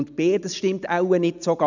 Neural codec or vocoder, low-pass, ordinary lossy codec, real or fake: none; 7.2 kHz; none; real